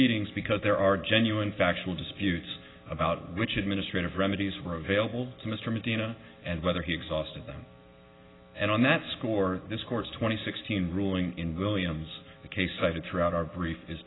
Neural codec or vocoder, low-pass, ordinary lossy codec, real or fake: none; 7.2 kHz; AAC, 16 kbps; real